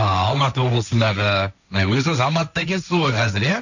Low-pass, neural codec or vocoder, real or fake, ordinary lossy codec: 7.2 kHz; codec, 16 kHz, 1.1 kbps, Voila-Tokenizer; fake; none